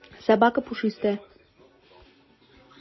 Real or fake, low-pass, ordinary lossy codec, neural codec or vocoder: real; 7.2 kHz; MP3, 24 kbps; none